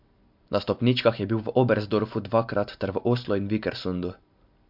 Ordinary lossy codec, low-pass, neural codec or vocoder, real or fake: MP3, 48 kbps; 5.4 kHz; none; real